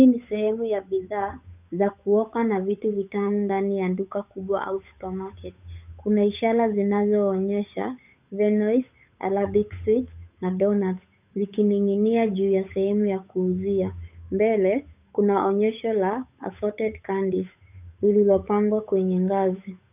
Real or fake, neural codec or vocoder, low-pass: fake; codec, 16 kHz, 8 kbps, FunCodec, trained on Chinese and English, 25 frames a second; 3.6 kHz